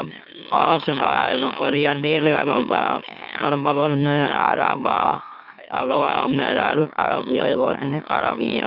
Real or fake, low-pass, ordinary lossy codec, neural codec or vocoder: fake; 5.4 kHz; none; autoencoder, 44.1 kHz, a latent of 192 numbers a frame, MeloTTS